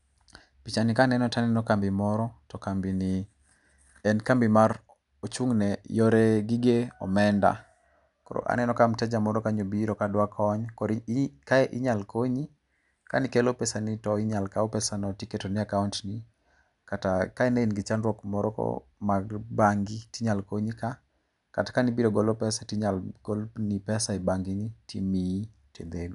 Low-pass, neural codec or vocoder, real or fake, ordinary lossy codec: 10.8 kHz; none; real; none